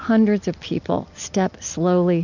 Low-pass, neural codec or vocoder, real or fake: 7.2 kHz; none; real